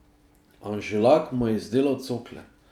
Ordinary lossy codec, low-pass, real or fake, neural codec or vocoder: none; 19.8 kHz; fake; vocoder, 44.1 kHz, 128 mel bands every 512 samples, BigVGAN v2